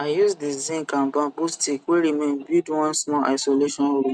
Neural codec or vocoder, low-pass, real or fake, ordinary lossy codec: none; none; real; none